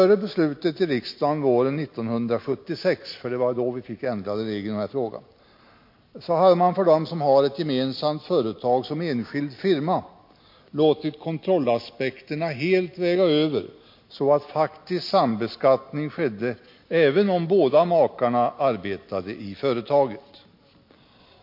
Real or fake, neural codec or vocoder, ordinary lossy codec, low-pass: real; none; MP3, 32 kbps; 5.4 kHz